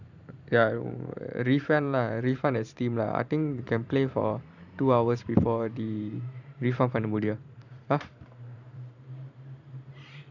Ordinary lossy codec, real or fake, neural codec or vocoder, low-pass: none; fake; vocoder, 44.1 kHz, 128 mel bands every 512 samples, BigVGAN v2; 7.2 kHz